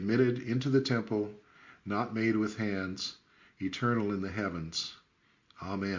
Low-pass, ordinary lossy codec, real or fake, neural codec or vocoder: 7.2 kHz; MP3, 48 kbps; real; none